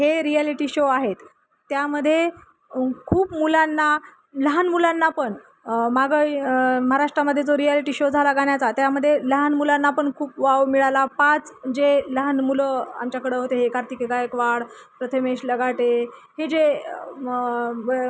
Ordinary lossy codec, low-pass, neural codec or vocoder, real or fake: none; none; none; real